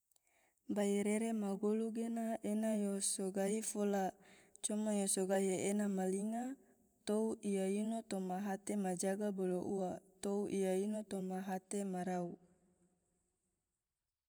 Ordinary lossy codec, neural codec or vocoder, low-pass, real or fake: none; vocoder, 44.1 kHz, 128 mel bands every 512 samples, BigVGAN v2; none; fake